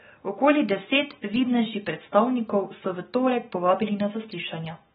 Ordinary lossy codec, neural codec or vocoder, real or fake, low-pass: AAC, 16 kbps; none; real; 19.8 kHz